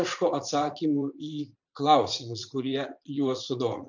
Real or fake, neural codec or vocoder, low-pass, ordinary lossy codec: fake; vocoder, 44.1 kHz, 80 mel bands, Vocos; 7.2 kHz; MP3, 64 kbps